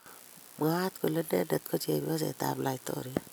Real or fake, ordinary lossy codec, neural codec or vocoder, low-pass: real; none; none; none